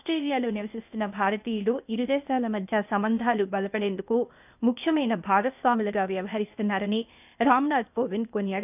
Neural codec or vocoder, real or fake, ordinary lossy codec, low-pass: codec, 16 kHz, 0.8 kbps, ZipCodec; fake; none; 3.6 kHz